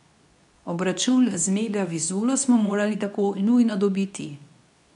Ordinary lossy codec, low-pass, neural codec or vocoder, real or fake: none; 10.8 kHz; codec, 24 kHz, 0.9 kbps, WavTokenizer, medium speech release version 2; fake